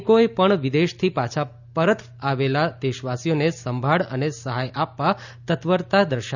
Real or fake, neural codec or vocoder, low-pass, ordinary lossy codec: real; none; 7.2 kHz; none